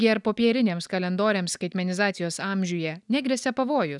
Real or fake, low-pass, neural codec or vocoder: real; 10.8 kHz; none